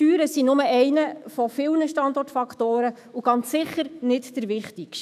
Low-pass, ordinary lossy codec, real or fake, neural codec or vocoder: 14.4 kHz; none; fake; autoencoder, 48 kHz, 128 numbers a frame, DAC-VAE, trained on Japanese speech